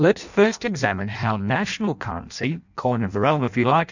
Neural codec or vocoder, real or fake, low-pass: codec, 16 kHz in and 24 kHz out, 0.6 kbps, FireRedTTS-2 codec; fake; 7.2 kHz